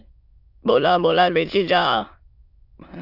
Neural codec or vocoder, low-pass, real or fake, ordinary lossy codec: autoencoder, 22.05 kHz, a latent of 192 numbers a frame, VITS, trained on many speakers; 5.4 kHz; fake; AAC, 48 kbps